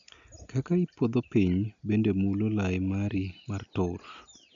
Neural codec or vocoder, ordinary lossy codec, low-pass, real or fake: none; none; 7.2 kHz; real